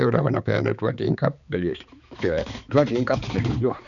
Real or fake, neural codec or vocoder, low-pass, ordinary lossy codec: fake; codec, 16 kHz, 4 kbps, X-Codec, HuBERT features, trained on balanced general audio; 7.2 kHz; none